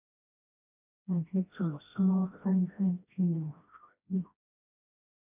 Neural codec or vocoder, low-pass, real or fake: codec, 16 kHz, 1 kbps, FreqCodec, smaller model; 3.6 kHz; fake